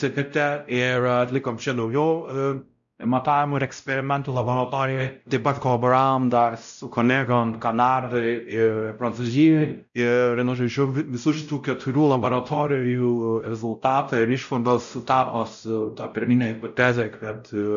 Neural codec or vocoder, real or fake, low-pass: codec, 16 kHz, 0.5 kbps, X-Codec, WavLM features, trained on Multilingual LibriSpeech; fake; 7.2 kHz